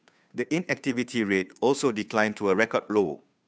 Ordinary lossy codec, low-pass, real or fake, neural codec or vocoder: none; none; fake; codec, 16 kHz, 2 kbps, FunCodec, trained on Chinese and English, 25 frames a second